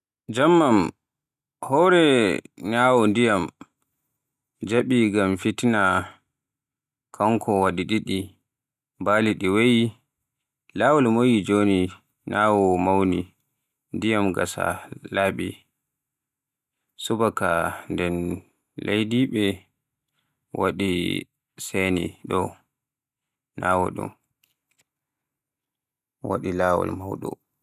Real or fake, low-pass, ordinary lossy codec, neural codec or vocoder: real; 14.4 kHz; none; none